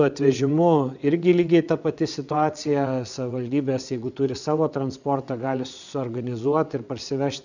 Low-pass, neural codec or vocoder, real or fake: 7.2 kHz; vocoder, 22.05 kHz, 80 mel bands, WaveNeXt; fake